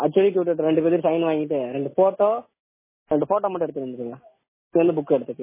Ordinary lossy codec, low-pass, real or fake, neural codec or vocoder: MP3, 16 kbps; 3.6 kHz; real; none